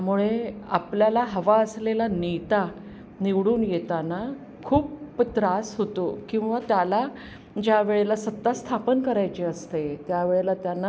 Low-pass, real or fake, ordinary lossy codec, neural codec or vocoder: none; real; none; none